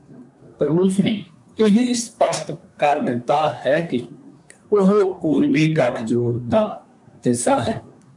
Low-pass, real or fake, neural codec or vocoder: 10.8 kHz; fake; codec, 24 kHz, 1 kbps, SNAC